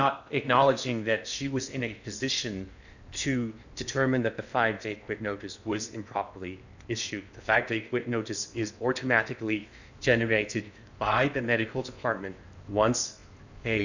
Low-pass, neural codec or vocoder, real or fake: 7.2 kHz; codec, 16 kHz in and 24 kHz out, 0.8 kbps, FocalCodec, streaming, 65536 codes; fake